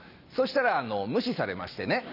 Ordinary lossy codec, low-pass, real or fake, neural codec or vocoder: none; 5.4 kHz; real; none